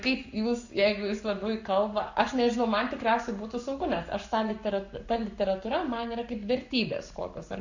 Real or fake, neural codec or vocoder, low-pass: fake; codec, 44.1 kHz, 7.8 kbps, Pupu-Codec; 7.2 kHz